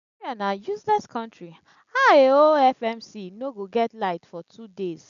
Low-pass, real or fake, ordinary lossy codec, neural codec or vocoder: 7.2 kHz; real; none; none